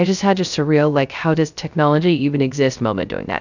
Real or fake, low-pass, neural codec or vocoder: fake; 7.2 kHz; codec, 16 kHz, 0.3 kbps, FocalCodec